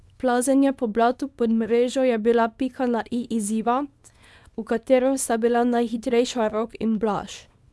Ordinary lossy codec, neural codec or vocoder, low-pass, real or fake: none; codec, 24 kHz, 0.9 kbps, WavTokenizer, small release; none; fake